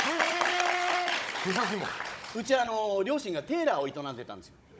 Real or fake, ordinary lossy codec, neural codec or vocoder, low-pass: fake; none; codec, 16 kHz, 16 kbps, FunCodec, trained on Chinese and English, 50 frames a second; none